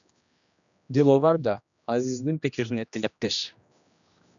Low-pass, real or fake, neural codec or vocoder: 7.2 kHz; fake; codec, 16 kHz, 1 kbps, X-Codec, HuBERT features, trained on general audio